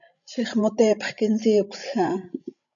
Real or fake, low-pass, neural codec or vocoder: fake; 7.2 kHz; codec, 16 kHz, 16 kbps, FreqCodec, larger model